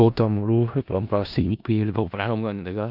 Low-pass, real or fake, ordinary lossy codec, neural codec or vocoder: 5.4 kHz; fake; none; codec, 16 kHz in and 24 kHz out, 0.4 kbps, LongCat-Audio-Codec, four codebook decoder